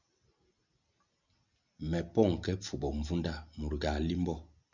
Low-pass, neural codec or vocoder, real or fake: 7.2 kHz; none; real